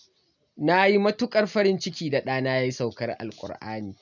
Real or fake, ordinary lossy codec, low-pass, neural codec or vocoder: real; none; 7.2 kHz; none